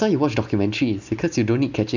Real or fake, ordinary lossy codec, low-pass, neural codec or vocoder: real; none; 7.2 kHz; none